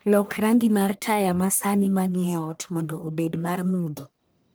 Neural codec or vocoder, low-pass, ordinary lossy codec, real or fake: codec, 44.1 kHz, 1.7 kbps, Pupu-Codec; none; none; fake